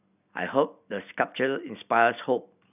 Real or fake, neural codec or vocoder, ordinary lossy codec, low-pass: real; none; none; 3.6 kHz